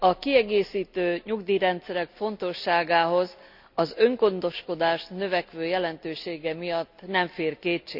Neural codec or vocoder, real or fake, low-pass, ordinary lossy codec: none; real; 5.4 kHz; none